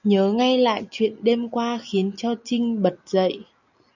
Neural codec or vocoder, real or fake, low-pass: none; real; 7.2 kHz